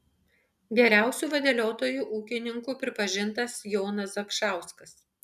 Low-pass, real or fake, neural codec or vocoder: 14.4 kHz; real; none